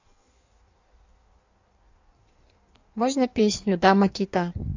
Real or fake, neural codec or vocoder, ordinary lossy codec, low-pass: fake; codec, 16 kHz in and 24 kHz out, 1.1 kbps, FireRedTTS-2 codec; AAC, 48 kbps; 7.2 kHz